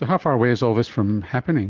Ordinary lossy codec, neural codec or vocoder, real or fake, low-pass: Opus, 16 kbps; none; real; 7.2 kHz